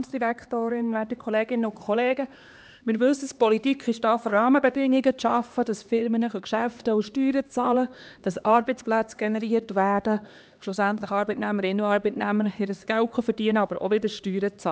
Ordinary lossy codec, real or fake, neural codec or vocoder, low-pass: none; fake; codec, 16 kHz, 2 kbps, X-Codec, HuBERT features, trained on LibriSpeech; none